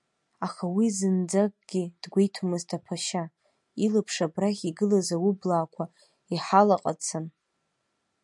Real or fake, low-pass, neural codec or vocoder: real; 10.8 kHz; none